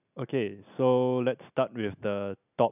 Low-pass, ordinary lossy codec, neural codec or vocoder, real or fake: 3.6 kHz; none; none; real